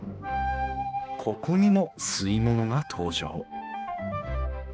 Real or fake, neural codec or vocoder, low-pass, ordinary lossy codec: fake; codec, 16 kHz, 2 kbps, X-Codec, HuBERT features, trained on balanced general audio; none; none